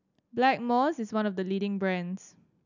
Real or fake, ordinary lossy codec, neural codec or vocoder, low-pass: real; none; none; 7.2 kHz